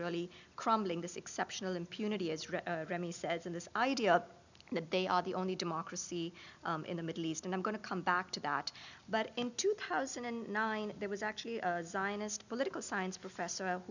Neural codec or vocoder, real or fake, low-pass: none; real; 7.2 kHz